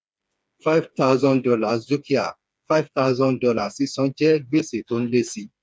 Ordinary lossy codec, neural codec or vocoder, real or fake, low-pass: none; codec, 16 kHz, 4 kbps, FreqCodec, smaller model; fake; none